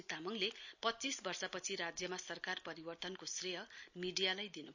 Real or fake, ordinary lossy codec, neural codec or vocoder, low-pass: real; none; none; 7.2 kHz